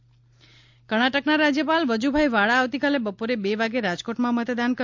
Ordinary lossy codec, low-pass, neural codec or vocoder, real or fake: MP3, 64 kbps; 7.2 kHz; none; real